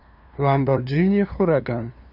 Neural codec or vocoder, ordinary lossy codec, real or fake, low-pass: codec, 16 kHz, 2 kbps, FunCodec, trained on LibriTTS, 25 frames a second; none; fake; 5.4 kHz